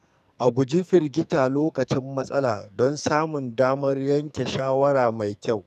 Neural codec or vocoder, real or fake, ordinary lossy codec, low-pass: codec, 44.1 kHz, 2.6 kbps, SNAC; fake; none; 14.4 kHz